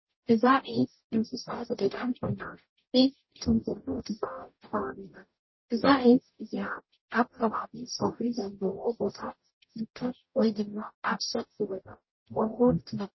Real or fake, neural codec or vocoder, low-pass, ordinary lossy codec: fake; codec, 44.1 kHz, 0.9 kbps, DAC; 7.2 kHz; MP3, 24 kbps